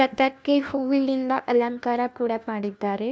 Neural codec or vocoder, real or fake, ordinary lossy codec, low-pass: codec, 16 kHz, 1 kbps, FunCodec, trained on LibriTTS, 50 frames a second; fake; none; none